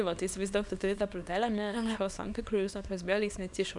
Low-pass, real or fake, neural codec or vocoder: 10.8 kHz; fake; codec, 24 kHz, 0.9 kbps, WavTokenizer, small release